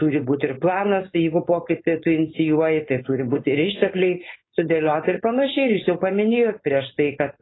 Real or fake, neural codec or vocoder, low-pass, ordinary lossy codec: fake; codec, 16 kHz, 4.8 kbps, FACodec; 7.2 kHz; AAC, 16 kbps